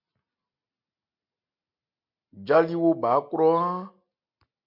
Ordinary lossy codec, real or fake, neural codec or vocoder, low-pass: MP3, 48 kbps; real; none; 5.4 kHz